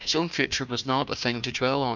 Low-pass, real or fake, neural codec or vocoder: 7.2 kHz; fake; codec, 16 kHz, 1 kbps, FunCodec, trained on Chinese and English, 50 frames a second